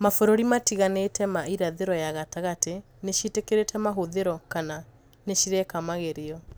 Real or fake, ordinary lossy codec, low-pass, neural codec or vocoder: real; none; none; none